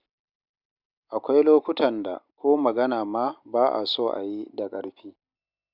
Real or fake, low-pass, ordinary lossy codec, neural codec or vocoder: real; 5.4 kHz; Opus, 64 kbps; none